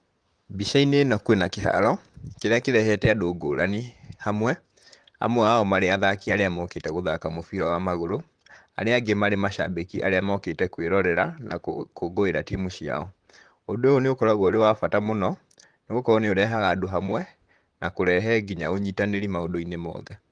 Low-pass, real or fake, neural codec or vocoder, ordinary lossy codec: 9.9 kHz; fake; vocoder, 44.1 kHz, 128 mel bands, Pupu-Vocoder; Opus, 24 kbps